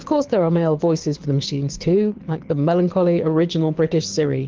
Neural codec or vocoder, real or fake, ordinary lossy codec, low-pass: codec, 24 kHz, 6 kbps, HILCodec; fake; Opus, 16 kbps; 7.2 kHz